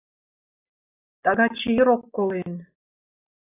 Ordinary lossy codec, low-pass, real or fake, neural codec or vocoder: AAC, 24 kbps; 3.6 kHz; real; none